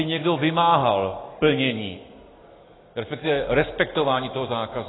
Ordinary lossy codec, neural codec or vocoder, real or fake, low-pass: AAC, 16 kbps; none; real; 7.2 kHz